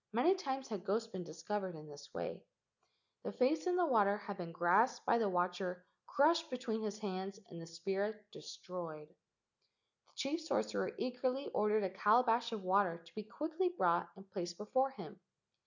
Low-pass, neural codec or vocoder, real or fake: 7.2 kHz; none; real